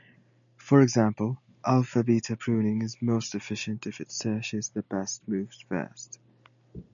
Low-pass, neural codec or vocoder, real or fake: 7.2 kHz; none; real